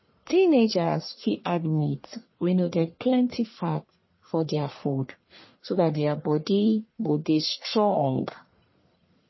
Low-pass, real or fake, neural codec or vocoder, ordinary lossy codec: 7.2 kHz; fake; codec, 44.1 kHz, 1.7 kbps, Pupu-Codec; MP3, 24 kbps